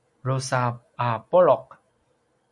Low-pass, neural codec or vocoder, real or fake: 10.8 kHz; none; real